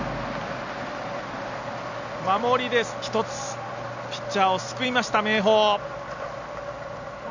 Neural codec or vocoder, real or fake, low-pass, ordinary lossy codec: none; real; 7.2 kHz; none